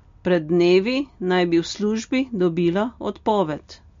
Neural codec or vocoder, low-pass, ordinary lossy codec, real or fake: none; 7.2 kHz; MP3, 48 kbps; real